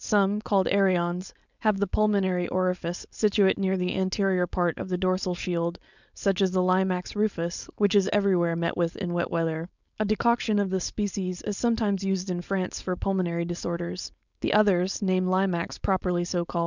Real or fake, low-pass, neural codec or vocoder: fake; 7.2 kHz; codec, 16 kHz, 4.8 kbps, FACodec